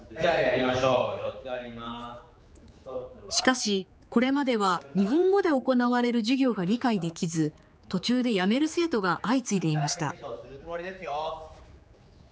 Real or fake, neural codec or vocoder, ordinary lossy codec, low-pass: fake; codec, 16 kHz, 4 kbps, X-Codec, HuBERT features, trained on general audio; none; none